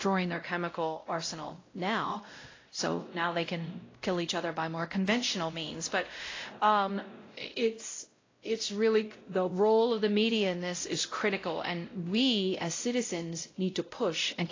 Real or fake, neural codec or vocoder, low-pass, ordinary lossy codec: fake; codec, 16 kHz, 0.5 kbps, X-Codec, WavLM features, trained on Multilingual LibriSpeech; 7.2 kHz; AAC, 32 kbps